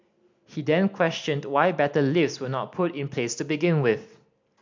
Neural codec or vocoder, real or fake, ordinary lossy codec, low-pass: none; real; MP3, 64 kbps; 7.2 kHz